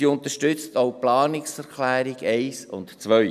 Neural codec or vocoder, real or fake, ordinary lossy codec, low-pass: none; real; none; 14.4 kHz